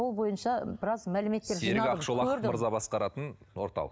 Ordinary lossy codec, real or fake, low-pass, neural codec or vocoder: none; real; none; none